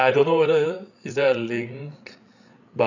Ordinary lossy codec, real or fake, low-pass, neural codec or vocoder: none; fake; 7.2 kHz; codec, 16 kHz, 8 kbps, FreqCodec, larger model